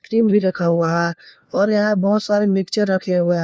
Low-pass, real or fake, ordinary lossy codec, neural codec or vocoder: none; fake; none; codec, 16 kHz, 1 kbps, FunCodec, trained on LibriTTS, 50 frames a second